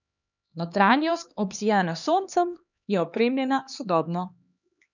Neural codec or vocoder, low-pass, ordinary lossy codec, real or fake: codec, 16 kHz, 2 kbps, X-Codec, HuBERT features, trained on LibriSpeech; 7.2 kHz; none; fake